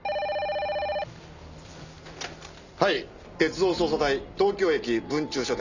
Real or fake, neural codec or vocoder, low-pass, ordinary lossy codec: real; none; 7.2 kHz; none